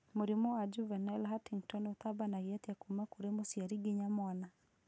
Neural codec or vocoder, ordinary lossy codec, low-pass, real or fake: none; none; none; real